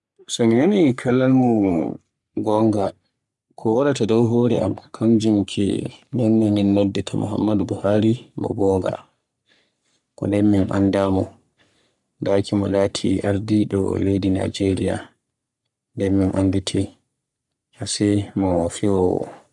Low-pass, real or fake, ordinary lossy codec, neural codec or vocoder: 10.8 kHz; fake; none; codec, 44.1 kHz, 3.4 kbps, Pupu-Codec